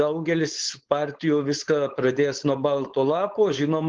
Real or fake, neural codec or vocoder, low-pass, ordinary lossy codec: fake; codec, 16 kHz, 4.8 kbps, FACodec; 7.2 kHz; Opus, 16 kbps